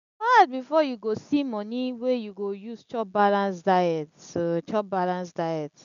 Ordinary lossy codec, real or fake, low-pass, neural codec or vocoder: none; real; 7.2 kHz; none